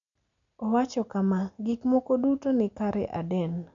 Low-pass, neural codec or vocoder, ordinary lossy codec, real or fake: 7.2 kHz; none; none; real